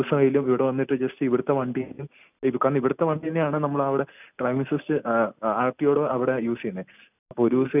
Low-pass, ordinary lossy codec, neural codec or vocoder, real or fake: 3.6 kHz; none; none; real